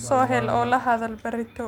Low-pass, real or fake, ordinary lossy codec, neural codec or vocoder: 19.8 kHz; real; MP3, 96 kbps; none